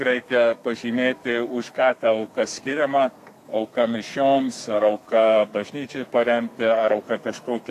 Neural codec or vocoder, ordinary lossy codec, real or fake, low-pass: codec, 32 kHz, 1.9 kbps, SNAC; AAC, 64 kbps; fake; 14.4 kHz